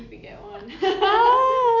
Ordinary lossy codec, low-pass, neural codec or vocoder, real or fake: none; 7.2 kHz; none; real